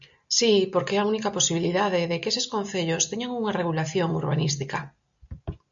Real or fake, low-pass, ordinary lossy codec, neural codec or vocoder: real; 7.2 kHz; MP3, 64 kbps; none